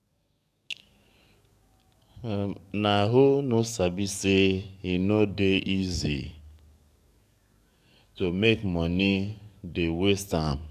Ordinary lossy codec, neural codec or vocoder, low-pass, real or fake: none; codec, 44.1 kHz, 7.8 kbps, DAC; 14.4 kHz; fake